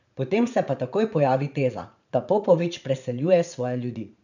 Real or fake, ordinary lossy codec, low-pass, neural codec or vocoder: fake; none; 7.2 kHz; vocoder, 44.1 kHz, 80 mel bands, Vocos